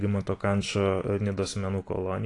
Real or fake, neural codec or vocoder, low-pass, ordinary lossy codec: real; none; 10.8 kHz; AAC, 48 kbps